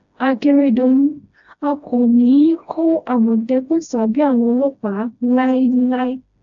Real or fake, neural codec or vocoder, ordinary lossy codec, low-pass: fake; codec, 16 kHz, 1 kbps, FreqCodec, smaller model; AAC, 64 kbps; 7.2 kHz